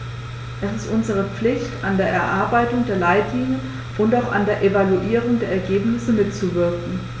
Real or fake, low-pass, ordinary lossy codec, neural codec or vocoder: real; none; none; none